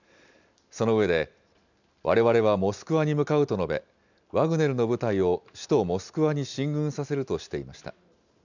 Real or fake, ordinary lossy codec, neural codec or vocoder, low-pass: real; none; none; 7.2 kHz